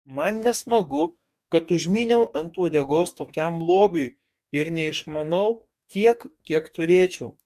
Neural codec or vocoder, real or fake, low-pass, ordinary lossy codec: codec, 44.1 kHz, 2.6 kbps, DAC; fake; 14.4 kHz; MP3, 96 kbps